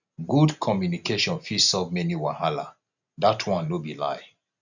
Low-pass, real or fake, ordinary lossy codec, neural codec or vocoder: 7.2 kHz; real; none; none